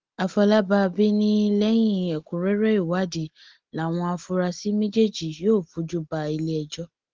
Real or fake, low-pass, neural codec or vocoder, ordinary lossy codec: real; 7.2 kHz; none; Opus, 16 kbps